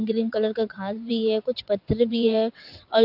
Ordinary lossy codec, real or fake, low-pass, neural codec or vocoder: AAC, 48 kbps; fake; 5.4 kHz; vocoder, 22.05 kHz, 80 mel bands, WaveNeXt